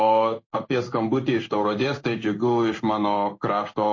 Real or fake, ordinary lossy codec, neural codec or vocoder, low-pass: fake; MP3, 32 kbps; codec, 16 kHz in and 24 kHz out, 1 kbps, XY-Tokenizer; 7.2 kHz